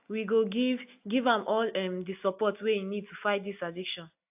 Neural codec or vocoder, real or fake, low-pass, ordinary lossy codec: none; real; 3.6 kHz; AAC, 32 kbps